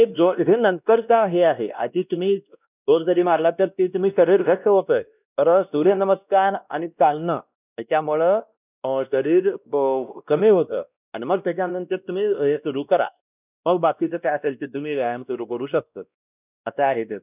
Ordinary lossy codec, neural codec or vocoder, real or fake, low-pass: none; codec, 16 kHz, 1 kbps, X-Codec, WavLM features, trained on Multilingual LibriSpeech; fake; 3.6 kHz